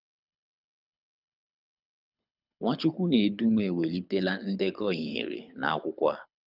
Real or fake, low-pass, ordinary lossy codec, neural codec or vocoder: fake; 5.4 kHz; none; codec, 24 kHz, 6 kbps, HILCodec